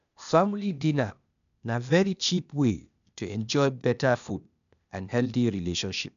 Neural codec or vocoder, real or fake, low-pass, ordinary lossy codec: codec, 16 kHz, 0.8 kbps, ZipCodec; fake; 7.2 kHz; none